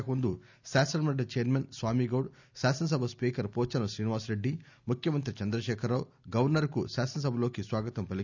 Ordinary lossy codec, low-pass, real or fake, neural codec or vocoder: none; 7.2 kHz; real; none